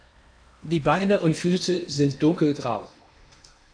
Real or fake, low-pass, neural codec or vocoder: fake; 9.9 kHz; codec, 16 kHz in and 24 kHz out, 0.8 kbps, FocalCodec, streaming, 65536 codes